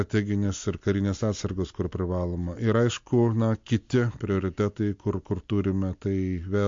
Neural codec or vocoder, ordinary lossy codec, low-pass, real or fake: none; MP3, 48 kbps; 7.2 kHz; real